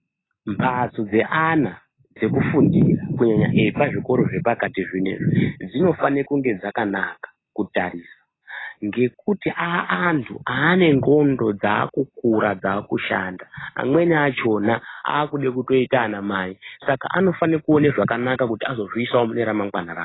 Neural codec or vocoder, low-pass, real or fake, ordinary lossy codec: none; 7.2 kHz; real; AAC, 16 kbps